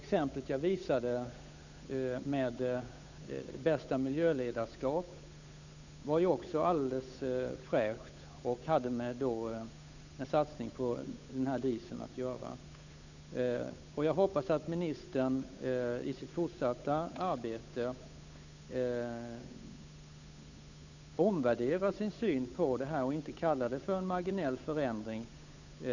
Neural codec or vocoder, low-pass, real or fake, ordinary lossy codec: codec, 16 kHz, 8 kbps, FunCodec, trained on Chinese and English, 25 frames a second; 7.2 kHz; fake; none